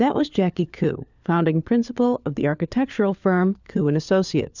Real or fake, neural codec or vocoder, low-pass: fake; codec, 16 kHz, 4 kbps, FunCodec, trained on LibriTTS, 50 frames a second; 7.2 kHz